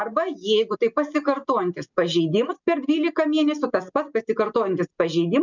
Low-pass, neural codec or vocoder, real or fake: 7.2 kHz; none; real